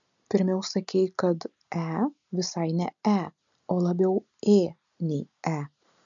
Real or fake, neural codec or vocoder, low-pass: real; none; 7.2 kHz